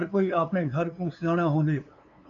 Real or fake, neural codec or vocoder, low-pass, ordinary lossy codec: fake; codec, 16 kHz, 2 kbps, FunCodec, trained on LibriTTS, 25 frames a second; 7.2 kHz; MP3, 64 kbps